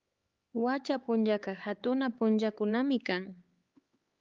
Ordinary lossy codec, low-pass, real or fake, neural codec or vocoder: Opus, 32 kbps; 7.2 kHz; fake; codec, 16 kHz, 4 kbps, X-Codec, HuBERT features, trained on LibriSpeech